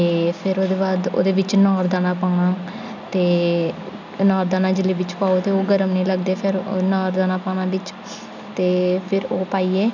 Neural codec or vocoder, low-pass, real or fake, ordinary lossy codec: none; 7.2 kHz; real; none